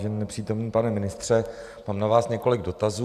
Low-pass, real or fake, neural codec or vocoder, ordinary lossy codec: 14.4 kHz; real; none; AAC, 96 kbps